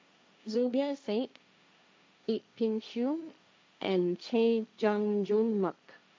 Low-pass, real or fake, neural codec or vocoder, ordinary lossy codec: none; fake; codec, 16 kHz, 1.1 kbps, Voila-Tokenizer; none